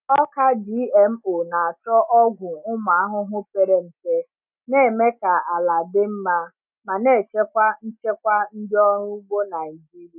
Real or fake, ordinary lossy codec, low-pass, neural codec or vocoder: real; none; 3.6 kHz; none